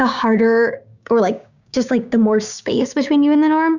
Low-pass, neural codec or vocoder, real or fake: 7.2 kHz; codec, 16 kHz, 6 kbps, DAC; fake